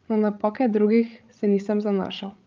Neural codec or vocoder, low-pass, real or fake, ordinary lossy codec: codec, 16 kHz, 8 kbps, FreqCodec, larger model; 7.2 kHz; fake; Opus, 24 kbps